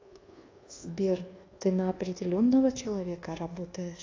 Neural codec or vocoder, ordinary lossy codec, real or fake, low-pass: codec, 24 kHz, 1.2 kbps, DualCodec; AAC, 32 kbps; fake; 7.2 kHz